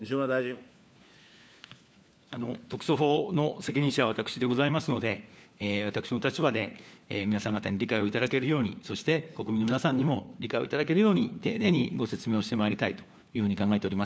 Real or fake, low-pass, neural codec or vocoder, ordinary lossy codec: fake; none; codec, 16 kHz, 4 kbps, FunCodec, trained on LibriTTS, 50 frames a second; none